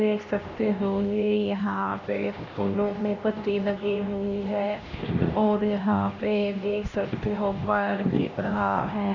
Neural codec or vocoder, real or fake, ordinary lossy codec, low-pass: codec, 16 kHz, 1 kbps, X-Codec, HuBERT features, trained on LibriSpeech; fake; none; 7.2 kHz